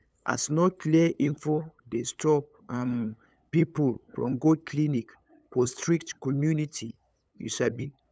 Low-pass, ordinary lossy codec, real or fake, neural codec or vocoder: none; none; fake; codec, 16 kHz, 8 kbps, FunCodec, trained on LibriTTS, 25 frames a second